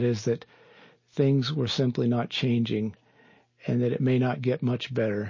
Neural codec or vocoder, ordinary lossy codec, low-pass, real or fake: none; MP3, 32 kbps; 7.2 kHz; real